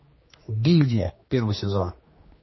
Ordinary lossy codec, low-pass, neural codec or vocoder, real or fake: MP3, 24 kbps; 7.2 kHz; codec, 16 kHz, 2 kbps, X-Codec, HuBERT features, trained on general audio; fake